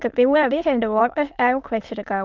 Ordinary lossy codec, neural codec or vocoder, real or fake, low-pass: Opus, 24 kbps; autoencoder, 22.05 kHz, a latent of 192 numbers a frame, VITS, trained on many speakers; fake; 7.2 kHz